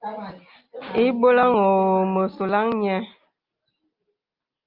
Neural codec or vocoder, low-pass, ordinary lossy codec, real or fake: none; 5.4 kHz; Opus, 24 kbps; real